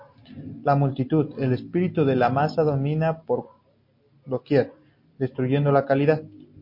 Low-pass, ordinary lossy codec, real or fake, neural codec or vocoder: 5.4 kHz; MP3, 32 kbps; real; none